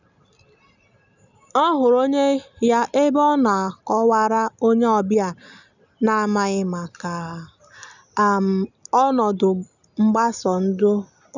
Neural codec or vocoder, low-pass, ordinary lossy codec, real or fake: none; 7.2 kHz; none; real